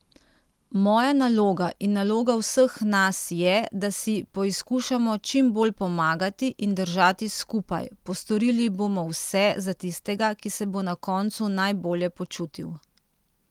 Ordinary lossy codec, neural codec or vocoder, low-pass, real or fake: Opus, 24 kbps; none; 19.8 kHz; real